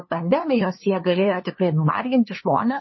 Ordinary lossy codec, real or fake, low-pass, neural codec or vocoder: MP3, 24 kbps; fake; 7.2 kHz; codec, 16 kHz, 2 kbps, FunCodec, trained on LibriTTS, 25 frames a second